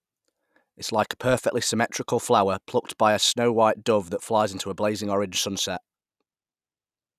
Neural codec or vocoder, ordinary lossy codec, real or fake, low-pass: none; none; real; 14.4 kHz